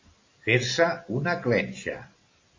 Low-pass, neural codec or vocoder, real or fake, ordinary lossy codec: 7.2 kHz; none; real; MP3, 32 kbps